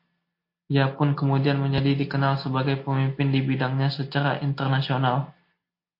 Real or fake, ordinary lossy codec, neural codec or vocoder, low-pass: real; MP3, 32 kbps; none; 5.4 kHz